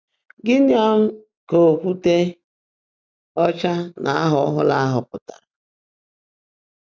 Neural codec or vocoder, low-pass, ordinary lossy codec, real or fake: none; none; none; real